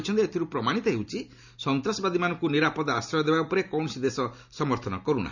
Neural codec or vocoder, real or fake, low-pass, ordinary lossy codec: none; real; 7.2 kHz; none